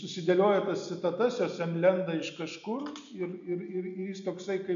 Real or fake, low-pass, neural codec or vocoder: real; 7.2 kHz; none